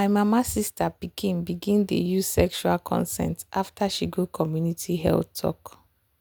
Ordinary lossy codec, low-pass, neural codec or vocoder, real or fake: none; none; none; real